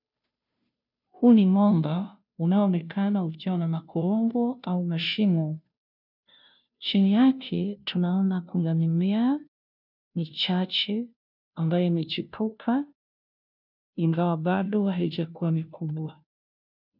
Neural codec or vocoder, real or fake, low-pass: codec, 16 kHz, 0.5 kbps, FunCodec, trained on Chinese and English, 25 frames a second; fake; 5.4 kHz